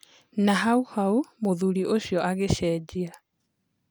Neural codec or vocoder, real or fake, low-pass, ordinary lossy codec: none; real; none; none